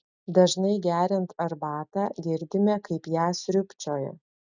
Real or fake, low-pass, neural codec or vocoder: real; 7.2 kHz; none